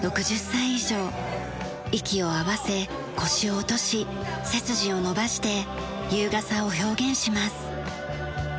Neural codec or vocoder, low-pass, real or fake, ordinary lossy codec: none; none; real; none